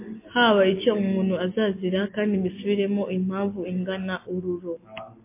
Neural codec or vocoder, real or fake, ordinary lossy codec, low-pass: none; real; MP3, 24 kbps; 3.6 kHz